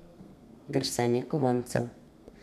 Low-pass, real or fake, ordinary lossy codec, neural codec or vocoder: 14.4 kHz; fake; MP3, 96 kbps; codec, 32 kHz, 1.9 kbps, SNAC